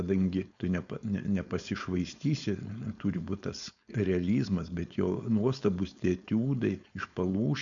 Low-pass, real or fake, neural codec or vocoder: 7.2 kHz; fake; codec, 16 kHz, 4.8 kbps, FACodec